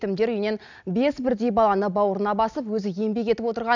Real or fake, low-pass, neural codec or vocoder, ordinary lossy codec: real; 7.2 kHz; none; none